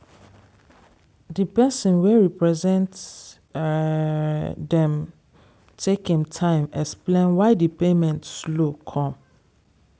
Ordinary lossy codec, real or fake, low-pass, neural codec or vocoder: none; real; none; none